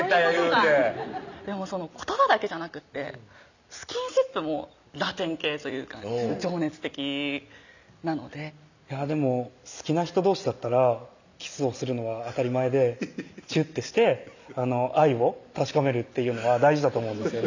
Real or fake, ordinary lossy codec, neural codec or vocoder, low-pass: real; none; none; 7.2 kHz